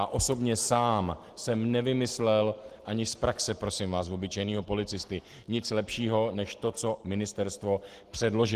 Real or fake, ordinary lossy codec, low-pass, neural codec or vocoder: real; Opus, 16 kbps; 14.4 kHz; none